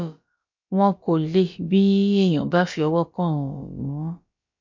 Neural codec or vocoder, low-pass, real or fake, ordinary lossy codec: codec, 16 kHz, about 1 kbps, DyCAST, with the encoder's durations; 7.2 kHz; fake; MP3, 48 kbps